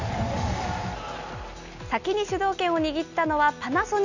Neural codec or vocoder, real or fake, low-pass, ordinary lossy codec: none; real; 7.2 kHz; none